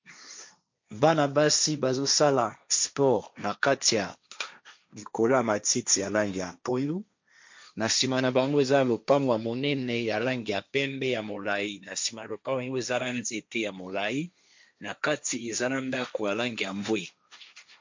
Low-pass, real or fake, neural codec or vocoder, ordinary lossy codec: 7.2 kHz; fake; codec, 16 kHz, 1.1 kbps, Voila-Tokenizer; MP3, 64 kbps